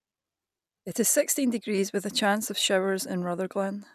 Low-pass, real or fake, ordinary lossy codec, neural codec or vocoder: 14.4 kHz; real; none; none